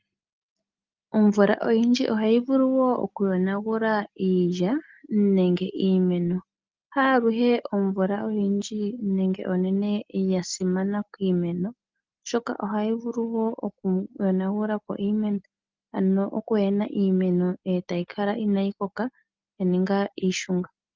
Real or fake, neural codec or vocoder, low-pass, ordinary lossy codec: real; none; 7.2 kHz; Opus, 24 kbps